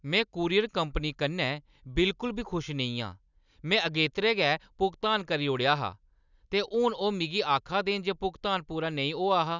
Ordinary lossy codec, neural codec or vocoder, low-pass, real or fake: none; none; 7.2 kHz; real